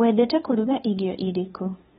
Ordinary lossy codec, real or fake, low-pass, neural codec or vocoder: AAC, 16 kbps; fake; 7.2 kHz; codec, 16 kHz, 2 kbps, FunCodec, trained on LibriTTS, 25 frames a second